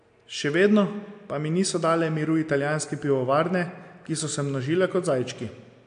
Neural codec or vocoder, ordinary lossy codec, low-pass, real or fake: none; AAC, 64 kbps; 9.9 kHz; real